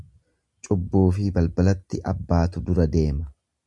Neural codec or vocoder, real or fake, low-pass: none; real; 10.8 kHz